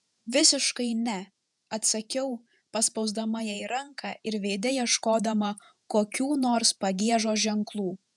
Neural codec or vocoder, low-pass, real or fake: vocoder, 48 kHz, 128 mel bands, Vocos; 10.8 kHz; fake